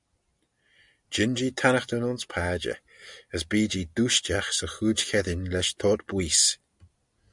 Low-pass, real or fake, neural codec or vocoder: 10.8 kHz; real; none